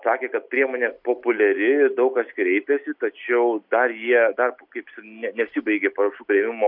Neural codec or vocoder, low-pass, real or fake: none; 5.4 kHz; real